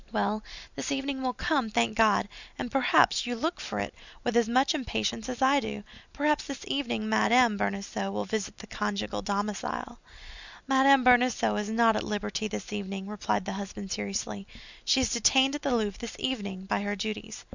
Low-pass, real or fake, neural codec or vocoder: 7.2 kHz; real; none